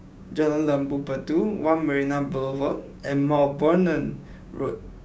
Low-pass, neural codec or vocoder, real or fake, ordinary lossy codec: none; codec, 16 kHz, 6 kbps, DAC; fake; none